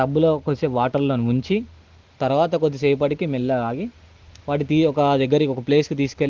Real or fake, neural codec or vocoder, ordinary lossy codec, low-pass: real; none; Opus, 16 kbps; 7.2 kHz